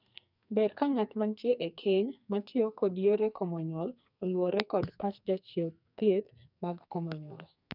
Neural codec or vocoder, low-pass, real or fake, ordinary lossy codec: codec, 44.1 kHz, 2.6 kbps, SNAC; 5.4 kHz; fake; none